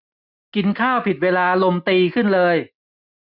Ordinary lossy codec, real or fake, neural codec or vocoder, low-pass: none; real; none; 5.4 kHz